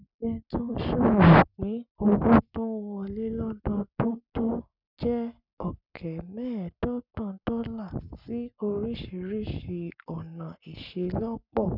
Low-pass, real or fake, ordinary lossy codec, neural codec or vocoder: 5.4 kHz; fake; none; codec, 44.1 kHz, 7.8 kbps, Pupu-Codec